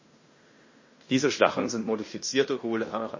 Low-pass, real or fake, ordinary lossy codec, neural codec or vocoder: 7.2 kHz; fake; MP3, 32 kbps; codec, 16 kHz in and 24 kHz out, 0.9 kbps, LongCat-Audio-Codec, fine tuned four codebook decoder